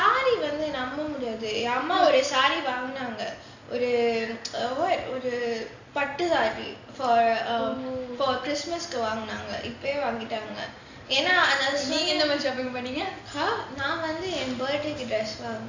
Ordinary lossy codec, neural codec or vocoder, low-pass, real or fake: AAC, 48 kbps; none; 7.2 kHz; real